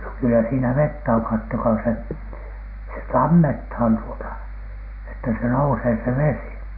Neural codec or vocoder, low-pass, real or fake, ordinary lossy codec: none; 5.4 kHz; real; Opus, 64 kbps